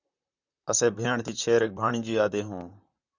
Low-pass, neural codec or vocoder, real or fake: 7.2 kHz; vocoder, 44.1 kHz, 128 mel bands, Pupu-Vocoder; fake